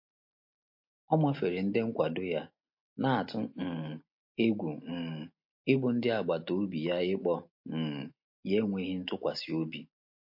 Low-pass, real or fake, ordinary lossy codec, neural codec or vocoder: 5.4 kHz; real; MP3, 32 kbps; none